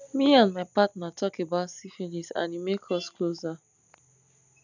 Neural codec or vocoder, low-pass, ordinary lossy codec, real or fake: none; 7.2 kHz; none; real